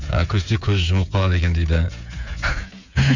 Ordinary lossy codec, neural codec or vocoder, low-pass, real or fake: none; codec, 16 kHz, 8 kbps, FreqCodec, smaller model; 7.2 kHz; fake